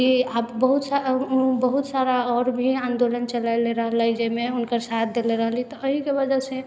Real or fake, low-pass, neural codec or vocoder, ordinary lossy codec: real; none; none; none